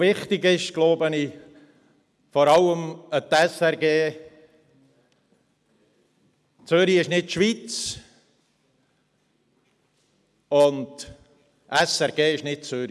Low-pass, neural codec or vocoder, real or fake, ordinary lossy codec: none; none; real; none